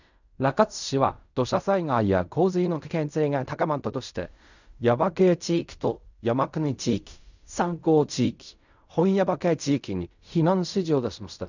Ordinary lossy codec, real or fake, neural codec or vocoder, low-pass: none; fake; codec, 16 kHz in and 24 kHz out, 0.4 kbps, LongCat-Audio-Codec, fine tuned four codebook decoder; 7.2 kHz